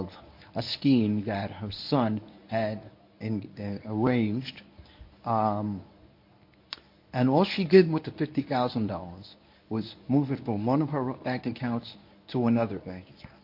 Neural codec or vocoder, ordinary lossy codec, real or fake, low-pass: codec, 24 kHz, 0.9 kbps, WavTokenizer, medium speech release version 1; MP3, 32 kbps; fake; 5.4 kHz